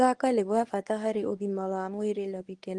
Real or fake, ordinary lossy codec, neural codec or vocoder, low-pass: fake; Opus, 24 kbps; codec, 24 kHz, 0.9 kbps, WavTokenizer, medium speech release version 1; 10.8 kHz